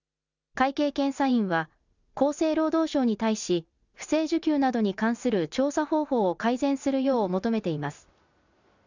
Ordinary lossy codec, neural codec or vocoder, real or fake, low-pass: none; none; real; 7.2 kHz